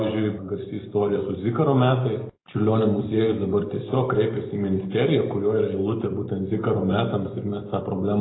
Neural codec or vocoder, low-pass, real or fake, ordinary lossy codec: none; 7.2 kHz; real; AAC, 16 kbps